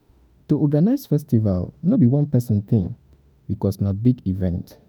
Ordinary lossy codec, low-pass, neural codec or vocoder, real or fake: none; none; autoencoder, 48 kHz, 32 numbers a frame, DAC-VAE, trained on Japanese speech; fake